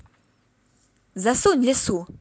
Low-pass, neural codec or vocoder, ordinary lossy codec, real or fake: none; none; none; real